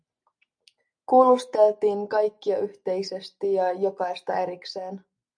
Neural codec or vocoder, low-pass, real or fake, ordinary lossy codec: none; 9.9 kHz; real; MP3, 64 kbps